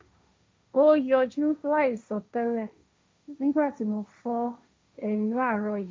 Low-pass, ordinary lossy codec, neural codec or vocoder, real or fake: none; none; codec, 16 kHz, 1.1 kbps, Voila-Tokenizer; fake